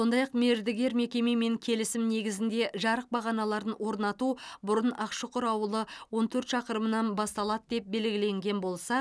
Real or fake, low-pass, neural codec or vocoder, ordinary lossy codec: real; none; none; none